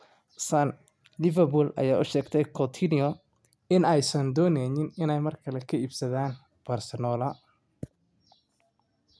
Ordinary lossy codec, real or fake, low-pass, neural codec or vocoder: none; real; none; none